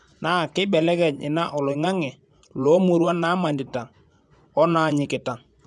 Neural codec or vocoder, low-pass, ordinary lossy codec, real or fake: vocoder, 24 kHz, 100 mel bands, Vocos; none; none; fake